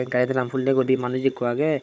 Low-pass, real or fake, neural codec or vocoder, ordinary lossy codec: none; fake; codec, 16 kHz, 16 kbps, FunCodec, trained on Chinese and English, 50 frames a second; none